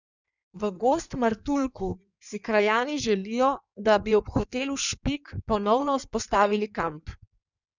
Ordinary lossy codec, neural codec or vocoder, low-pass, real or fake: none; codec, 16 kHz in and 24 kHz out, 1.1 kbps, FireRedTTS-2 codec; 7.2 kHz; fake